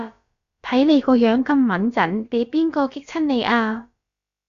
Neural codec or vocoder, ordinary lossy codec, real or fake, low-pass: codec, 16 kHz, about 1 kbps, DyCAST, with the encoder's durations; Opus, 64 kbps; fake; 7.2 kHz